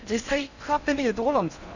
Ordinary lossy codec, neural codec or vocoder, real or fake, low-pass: none; codec, 16 kHz in and 24 kHz out, 0.6 kbps, FocalCodec, streaming, 4096 codes; fake; 7.2 kHz